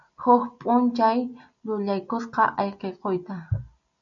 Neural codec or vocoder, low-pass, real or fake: none; 7.2 kHz; real